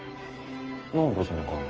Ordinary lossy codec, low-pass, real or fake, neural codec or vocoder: Opus, 24 kbps; 7.2 kHz; fake; codec, 44.1 kHz, 7.8 kbps, Pupu-Codec